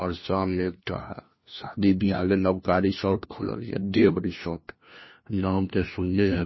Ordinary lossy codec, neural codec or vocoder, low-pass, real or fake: MP3, 24 kbps; codec, 16 kHz, 1 kbps, FunCodec, trained on LibriTTS, 50 frames a second; 7.2 kHz; fake